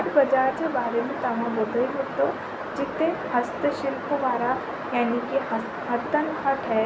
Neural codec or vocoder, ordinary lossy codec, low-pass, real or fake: none; none; none; real